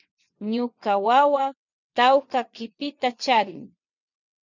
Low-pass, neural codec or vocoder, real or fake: 7.2 kHz; vocoder, 22.05 kHz, 80 mel bands, WaveNeXt; fake